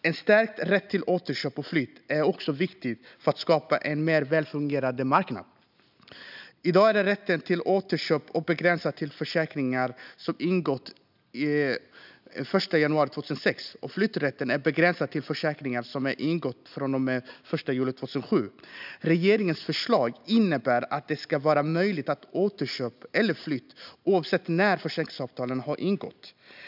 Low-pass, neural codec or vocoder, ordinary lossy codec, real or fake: 5.4 kHz; none; none; real